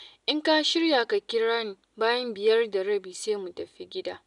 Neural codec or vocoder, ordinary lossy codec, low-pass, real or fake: none; none; 10.8 kHz; real